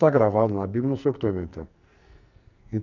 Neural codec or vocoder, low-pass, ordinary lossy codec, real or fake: codec, 44.1 kHz, 2.6 kbps, SNAC; 7.2 kHz; none; fake